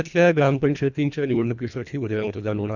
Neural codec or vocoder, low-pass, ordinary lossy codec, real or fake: codec, 24 kHz, 1.5 kbps, HILCodec; 7.2 kHz; none; fake